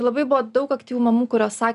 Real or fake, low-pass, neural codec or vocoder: real; 10.8 kHz; none